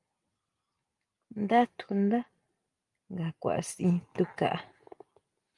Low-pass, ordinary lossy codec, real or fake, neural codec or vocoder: 10.8 kHz; Opus, 32 kbps; real; none